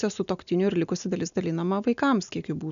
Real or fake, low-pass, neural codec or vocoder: real; 7.2 kHz; none